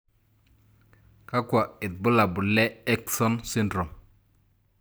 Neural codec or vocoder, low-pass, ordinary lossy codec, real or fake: none; none; none; real